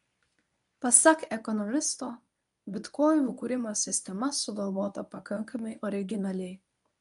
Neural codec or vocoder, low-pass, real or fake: codec, 24 kHz, 0.9 kbps, WavTokenizer, medium speech release version 1; 10.8 kHz; fake